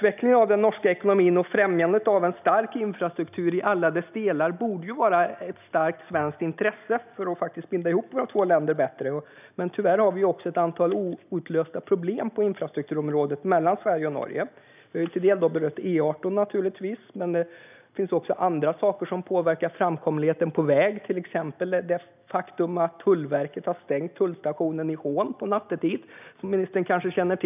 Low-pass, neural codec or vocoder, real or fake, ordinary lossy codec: 3.6 kHz; none; real; none